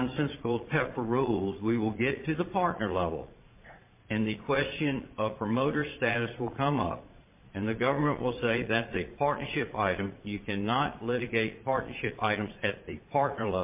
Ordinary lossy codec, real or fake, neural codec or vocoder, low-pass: MP3, 32 kbps; fake; vocoder, 22.05 kHz, 80 mel bands, Vocos; 3.6 kHz